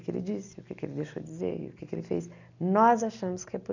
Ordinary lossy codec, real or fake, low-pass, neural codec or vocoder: none; real; 7.2 kHz; none